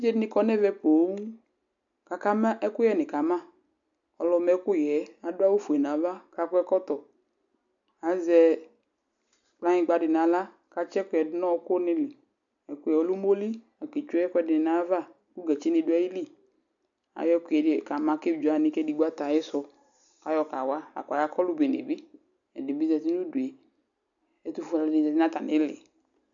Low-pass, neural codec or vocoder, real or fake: 7.2 kHz; none; real